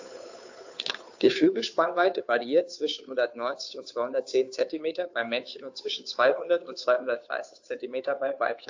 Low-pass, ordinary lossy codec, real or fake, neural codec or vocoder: 7.2 kHz; AAC, 48 kbps; fake; codec, 16 kHz, 2 kbps, FunCodec, trained on Chinese and English, 25 frames a second